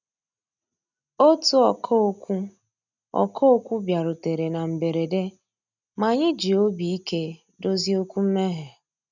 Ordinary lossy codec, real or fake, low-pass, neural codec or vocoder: none; real; 7.2 kHz; none